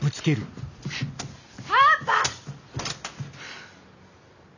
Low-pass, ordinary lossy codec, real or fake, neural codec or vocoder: 7.2 kHz; none; real; none